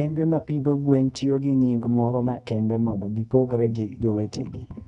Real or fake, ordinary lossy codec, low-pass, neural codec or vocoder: fake; none; 10.8 kHz; codec, 24 kHz, 0.9 kbps, WavTokenizer, medium music audio release